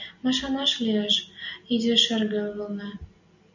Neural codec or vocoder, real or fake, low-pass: none; real; 7.2 kHz